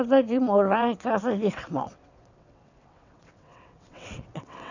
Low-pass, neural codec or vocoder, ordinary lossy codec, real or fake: 7.2 kHz; vocoder, 22.05 kHz, 80 mel bands, WaveNeXt; none; fake